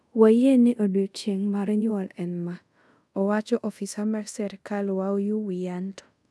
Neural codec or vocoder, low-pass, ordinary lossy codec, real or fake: codec, 24 kHz, 0.5 kbps, DualCodec; none; none; fake